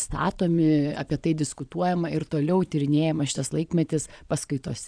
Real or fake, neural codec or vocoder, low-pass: fake; vocoder, 22.05 kHz, 80 mel bands, Vocos; 9.9 kHz